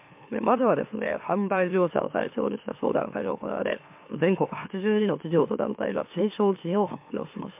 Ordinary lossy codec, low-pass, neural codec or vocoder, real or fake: MP3, 32 kbps; 3.6 kHz; autoencoder, 44.1 kHz, a latent of 192 numbers a frame, MeloTTS; fake